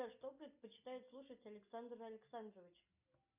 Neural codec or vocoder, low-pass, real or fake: none; 3.6 kHz; real